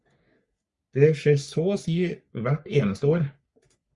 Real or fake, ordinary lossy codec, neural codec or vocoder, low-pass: fake; Opus, 64 kbps; codec, 44.1 kHz, 3.4 kbps, Pupu-Codec; 10.8 kHz